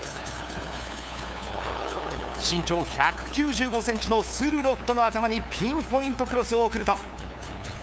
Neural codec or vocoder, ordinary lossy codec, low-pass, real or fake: codec, 16 kHz, 2 kbps, FunCodec, trained on LibriTTS, 25 frames a second; none; none; fake